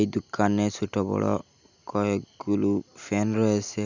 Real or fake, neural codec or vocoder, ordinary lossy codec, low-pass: real; none; none; 7.2 kHz